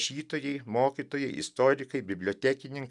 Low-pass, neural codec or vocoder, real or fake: 10.8 kHz; none; real